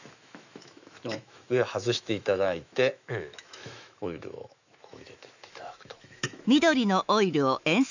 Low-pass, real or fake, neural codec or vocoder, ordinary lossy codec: 7.2 kHz; fake; autoencoder, 48 kHz, 128 numbers a frame, DAC-VAE, trained on Japanese speech; none